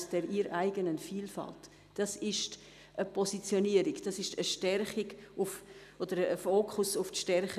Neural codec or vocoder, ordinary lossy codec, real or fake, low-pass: none; none; real; 14.4 kHz